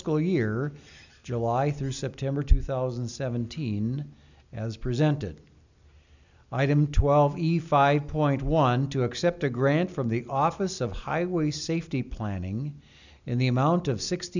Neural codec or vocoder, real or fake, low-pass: none; real; 7.2 kHz